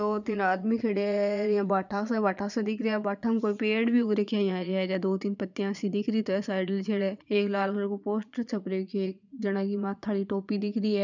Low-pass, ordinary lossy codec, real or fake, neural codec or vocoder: 7.2 kHz; none; fake; vocoder, 22.05 kHz, 80 mel bands, Vocos